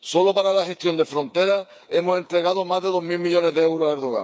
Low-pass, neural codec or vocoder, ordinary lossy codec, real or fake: none; codec, 16 kHz, 4 kbps, FreqCodec, smaller model; none; fake